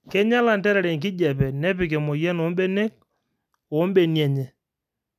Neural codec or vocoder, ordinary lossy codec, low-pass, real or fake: none; none; 14.4 kHz; real